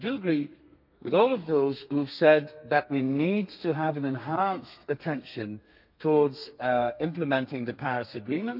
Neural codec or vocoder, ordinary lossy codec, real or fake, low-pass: codec, 32 kHz, 1.9 kbps, SNAC; MP3, 48 kbps; fake; 5.4 kHz